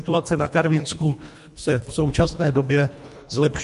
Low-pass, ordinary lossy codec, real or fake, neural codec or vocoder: 10.8 kHz; MP3, 64 kbps; fake; codec, 24 kHz, 1.5 kbps, HILCodec